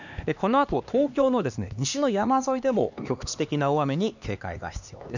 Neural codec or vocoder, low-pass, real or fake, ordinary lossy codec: codec, 16 kHz, 2 kbps, X-Codec, HuBERT features, trained on LibriSpeech; 7.2 kHz; fake; none